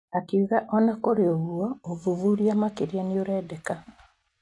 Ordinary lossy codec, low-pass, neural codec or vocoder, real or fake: AAC, 48 kbps; 10.8 kHz; none; real